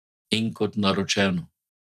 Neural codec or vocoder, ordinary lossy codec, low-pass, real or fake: none; none; 14.4 kHz; real